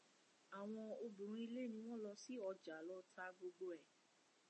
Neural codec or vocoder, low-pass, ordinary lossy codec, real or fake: none; 10.8 kHz; MP3, 32 kbps; real